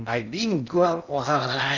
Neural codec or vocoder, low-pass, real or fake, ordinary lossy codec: codec, 16 kHz in and 24 kHz out, 0.6 kbps, FocalCodec, streaming, 2048 codes; 7.2 kHz; fake; none